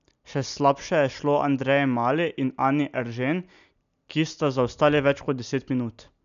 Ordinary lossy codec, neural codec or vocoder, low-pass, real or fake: none; none; 7.2 kHz; real